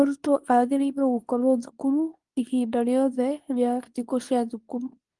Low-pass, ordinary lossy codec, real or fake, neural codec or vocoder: 10.8 kHz; Opus, 24 kbps; fake; codec, 24 kHz, 0.9 kbps, WavTokenizer, medium speech release version 2